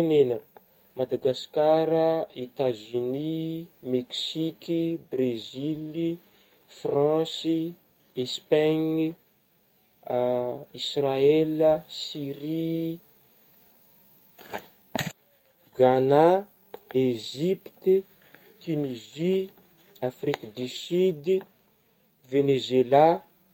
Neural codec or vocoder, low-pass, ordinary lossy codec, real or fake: codec, 44.1 kHz, 7.8 kbps, DAC; 19.8 kHz; MP3, 64 kbps; fake